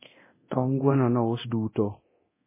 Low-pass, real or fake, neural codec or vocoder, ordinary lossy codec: 3.6 kHz; fake; codec, 24 kHz, 0.9 kbps, DualCodec; MP3, 16 kbps